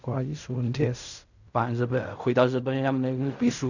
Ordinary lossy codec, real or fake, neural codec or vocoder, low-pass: none; fake; codec, 16 kHz in and 24 kHz out, 0.4 kbps, LongCat-Audio-Codec, fine tuned four codebook decoder; 7.2 kHz